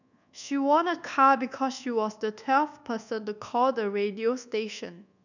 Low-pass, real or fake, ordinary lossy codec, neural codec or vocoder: 7.2 kHz; fake; none; codec, 24 kHz, 1.2 kbps, DualCodec